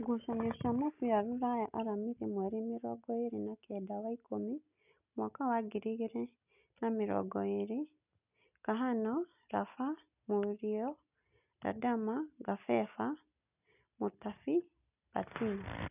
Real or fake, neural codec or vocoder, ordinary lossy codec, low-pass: real; none; none; 3.6 kHz